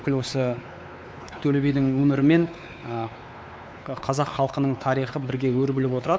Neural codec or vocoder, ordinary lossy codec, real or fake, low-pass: codec, 16 kHz, 4 kbps, X-Codec, WavLM features, trained on Multilingual LibriSpeech; none; fake; none